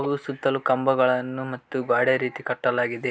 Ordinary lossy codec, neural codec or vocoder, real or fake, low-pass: none; none; real; none